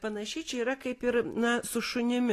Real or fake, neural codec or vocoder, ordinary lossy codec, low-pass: real; none; AAC, 48 kbps; 14.4 kHz